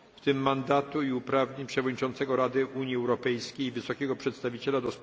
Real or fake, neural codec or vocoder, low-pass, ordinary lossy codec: real; none; none; none